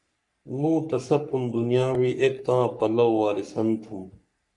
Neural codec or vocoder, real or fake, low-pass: codec, 44.1 kHz, 3.4 kbps, Pupu-Codec; fake; 10.8 kHz